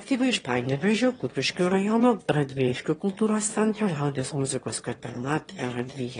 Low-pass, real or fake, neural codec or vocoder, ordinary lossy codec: 9.9 kHz; fake; autoencoder, 22.05 kHz, a latent of 192 numbers a frame, VITS, trained on one speaker; AAC, 32 kbps